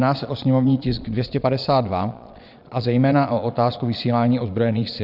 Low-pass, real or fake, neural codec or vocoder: 5.4 kHz; fake; vocoder, 22.05 kHz, 80 mel bands, Vocos